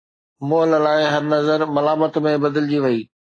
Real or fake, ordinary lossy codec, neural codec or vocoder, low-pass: real; AAC, 32 kbps; none; 9.9 kHz